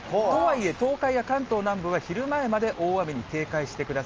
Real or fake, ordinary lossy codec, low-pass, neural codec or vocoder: real; Opus, 24 kbps; 7.2 kHz; none